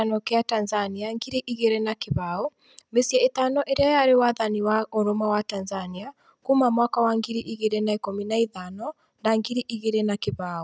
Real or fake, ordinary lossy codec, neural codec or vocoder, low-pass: real; none; none; none